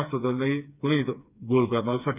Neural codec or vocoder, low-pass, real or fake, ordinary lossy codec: codec, 16 kHz, 4 kbps, FreqCodec, smaller model; 3.6 kHz; fake; none